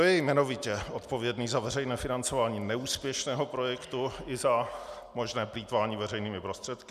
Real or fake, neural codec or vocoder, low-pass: real; none; 14.4 kHz